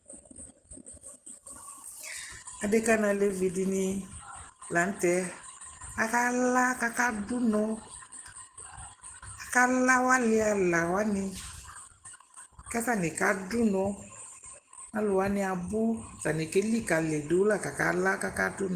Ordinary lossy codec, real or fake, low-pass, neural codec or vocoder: Opus, 16 kbps; real; 14.4 kHz; none